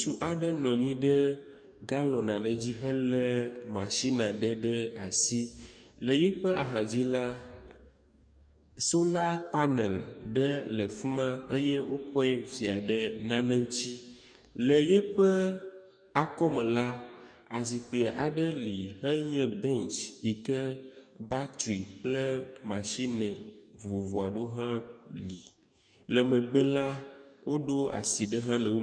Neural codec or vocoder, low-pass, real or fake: codec, 44.1 kHz, 2.6 kbps, DAC; 9.9 kHz; fake